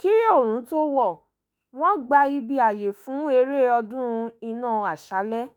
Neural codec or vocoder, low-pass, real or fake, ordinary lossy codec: autoencoder, 48 kHz, 32 numbers a frame, DAC-VAE, trained on Japanese speech; none; fake; none